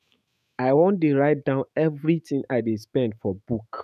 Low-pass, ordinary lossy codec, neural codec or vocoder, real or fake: 14.4 kHz; none; autoencoder, 48 kHz, 128 numbers a frame, DAC-VAE, trained on Japanese speech; fake